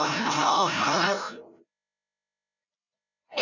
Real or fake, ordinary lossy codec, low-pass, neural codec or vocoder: fake; none; 7.2 kHz; codec, 16 kHz, 0.5 kbps, FreqCodec, larger model